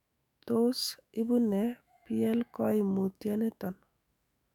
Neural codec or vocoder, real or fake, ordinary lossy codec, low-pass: autoencoder, 48 kHz, 128 numbers a frame, DAC-VAE, trained on Japanese speech; fake; none; 19.8 kHz